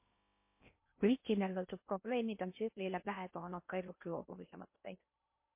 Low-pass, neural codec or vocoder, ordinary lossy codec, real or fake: 3.6 kHz; codec, 16 kHz in and 24 kHz out, 0.6 kbps, FocalCodec, streaming, 2048 codes; MP3, 24 kbps; fake